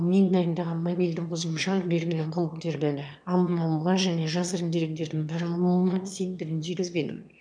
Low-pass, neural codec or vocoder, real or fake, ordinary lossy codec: 9.9 kHz; autoencoder, 22.05 kHz, a latent of 192 numbers a frame, VITS, trained on one speaker; fake; none